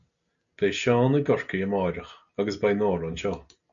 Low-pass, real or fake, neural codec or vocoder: 7.2 kHz; real; none